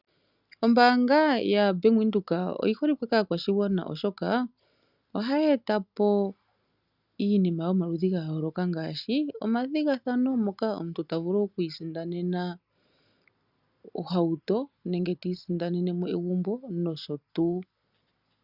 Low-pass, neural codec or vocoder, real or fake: 5.4 kHz; none; real